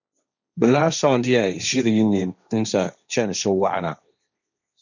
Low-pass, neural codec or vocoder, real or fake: 7.2 kHz; codec, 16 kHz, 1.1 kbps, Voila-Tokenizer; fake